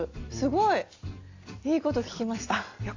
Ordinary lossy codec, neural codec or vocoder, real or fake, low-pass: AAC, 48 kbps; none; real; 7.2 kHz